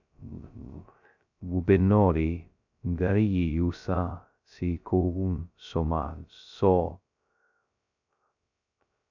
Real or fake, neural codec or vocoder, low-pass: fake; codec, 16 kHz, 0.2 kbps, FocalCodec; 7.2 kHz